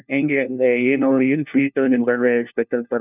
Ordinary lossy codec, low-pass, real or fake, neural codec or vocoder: none; 3.6 kHz; fake; codec, 16 kHz, 1 kbps, FunCodec, trained on LibriTTS, 50 frames a second